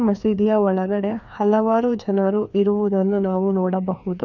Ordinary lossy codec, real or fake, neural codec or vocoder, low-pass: none; fake; codec, 16 kHz, 2 kbps, FreqCodec, larger model; 7.2 kHz